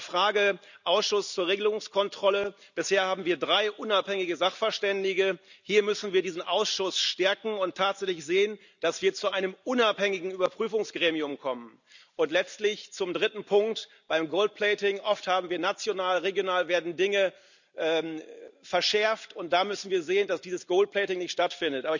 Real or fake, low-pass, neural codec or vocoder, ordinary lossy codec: real; 7.2 kHz; none; none